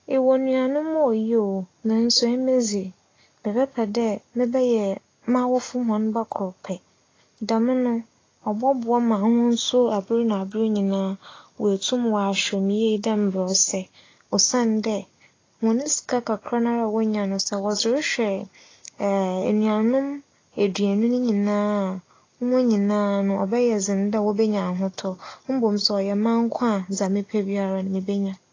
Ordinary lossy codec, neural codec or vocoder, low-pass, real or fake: AAC, 32 kbps; none; 7.2 kHz; real